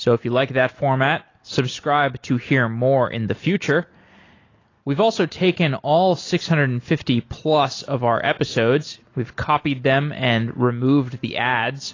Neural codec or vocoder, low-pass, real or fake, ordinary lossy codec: none; 7.2 kHz; real; AAC, 32 kbps